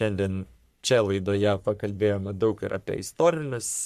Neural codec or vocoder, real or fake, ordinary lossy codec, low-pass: codec, 44.1 kHz, 3.4 kbps, Pupu-Codec; fake; MP3, 96 kbps; 14.4 kHz